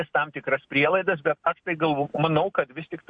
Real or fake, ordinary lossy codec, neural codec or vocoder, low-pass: real; MP3, 48 kbps; none; 9.9 kHz